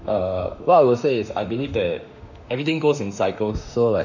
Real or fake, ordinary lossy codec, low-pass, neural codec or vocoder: fake; none; 7.2 kHz; autoencoder, 48 kHz, 32 numbers a frame, DAC-VAE, trained on Japanese speech